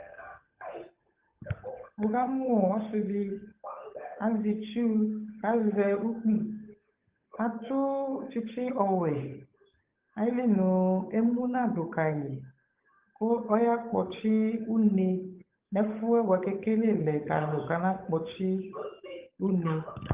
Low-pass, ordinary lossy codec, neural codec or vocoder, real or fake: 3.6 kHz; Opus, 24 kbps; codec, 16 kHz, 8 kbps, FunCodec, trained on Chinese and English, 25 frames a second; fake